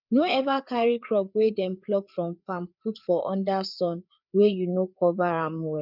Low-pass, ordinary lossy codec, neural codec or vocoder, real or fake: 5.4 kHz; none; codec, 16 kHz, 4 kbps, FreqCodec, larger model; fake